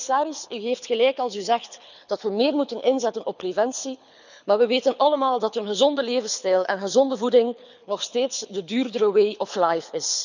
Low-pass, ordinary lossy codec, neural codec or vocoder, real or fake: 7.2 kHz; none; codec, 24 kHz, 6 kbps, HILCodec; fake